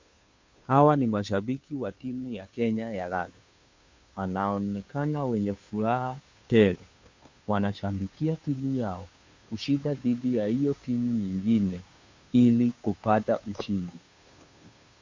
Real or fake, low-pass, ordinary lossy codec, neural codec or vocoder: fake; 7.2 kHz; MP3, 64 kbps; codec, 16 kHz, 2 kbps, FunCodec, trained on Chinese and English, 25 frames a second